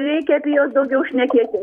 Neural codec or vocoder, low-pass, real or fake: vocoder, 44.1 kHz, 128 mel bands every 256 samples, BigVGAN v2; 19.8 kHz; fake